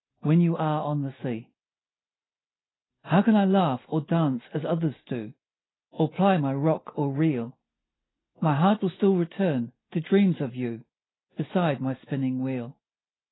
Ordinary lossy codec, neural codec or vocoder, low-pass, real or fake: AAC, 16 kbps; none; 7.2 kHz; real